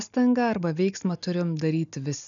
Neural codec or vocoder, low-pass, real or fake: none; 7.2 kHz; real